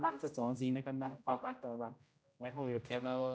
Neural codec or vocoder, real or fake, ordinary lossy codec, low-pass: codec, 16 kHz, 0.5 kbps, X-Codec, HuBERT features, trained on general audio; fake; none; none